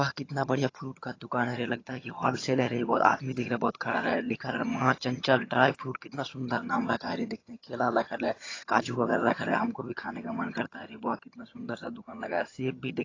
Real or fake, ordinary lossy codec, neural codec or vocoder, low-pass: fake; AAC, 32 kbps; vocoder, 22.05 kHz, 80 mel bands, HiFi-GAN; 7.2 kHz